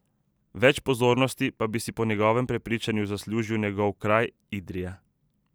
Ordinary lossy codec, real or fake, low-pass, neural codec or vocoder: none; real; none; none